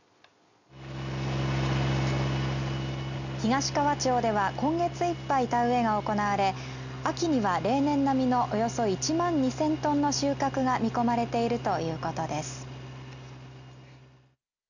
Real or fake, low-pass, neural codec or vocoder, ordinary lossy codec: real; 7.2 kHz; none; none